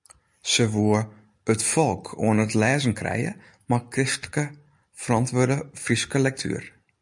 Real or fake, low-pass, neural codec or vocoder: real; 10.8 kHz; none